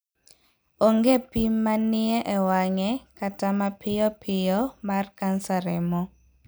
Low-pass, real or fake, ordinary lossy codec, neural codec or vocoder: none; real; none; none